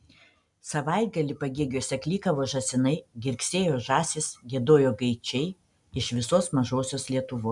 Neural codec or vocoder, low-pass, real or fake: none; 10.8 kHz; real